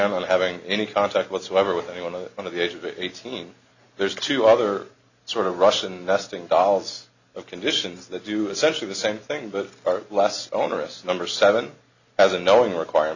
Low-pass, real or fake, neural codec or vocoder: 7.2 kHz; real; none